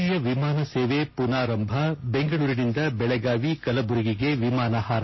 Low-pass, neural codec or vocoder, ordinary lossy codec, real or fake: 7.2 kHz; none; MP3, 24 kbps; real